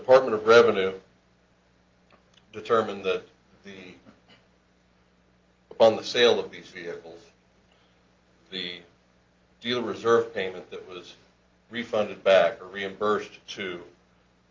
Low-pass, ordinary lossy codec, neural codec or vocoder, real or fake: 7.2 kHz; Opus, 32 kbps; none; real